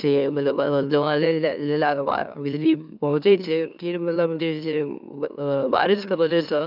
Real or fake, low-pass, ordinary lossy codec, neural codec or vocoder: fake; 5.4 kHz; none; autoencoder, 44.1 kHz, a latent of 192 numbers a frame, MeloTTS